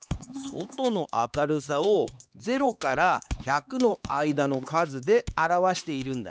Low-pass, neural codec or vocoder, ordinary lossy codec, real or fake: none; codec, 16 kHz, 2 kbps, X-Codec, HuBERT features, trained on LibriSpeech; none; fake